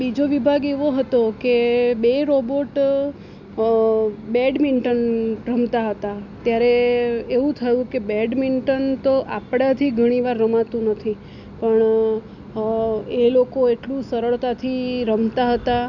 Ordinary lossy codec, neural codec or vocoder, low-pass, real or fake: none; none; 7.2 kHz; real